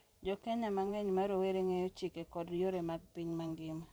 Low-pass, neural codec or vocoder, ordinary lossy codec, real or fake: none; vocoder, 44.1 kHz, 128 mel bands every 512 samples, BigVGAN v2; none; fake